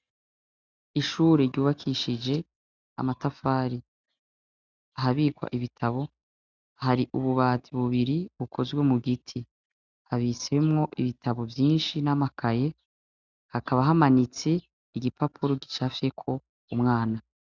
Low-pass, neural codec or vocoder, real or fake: 7.2 kHz; none; real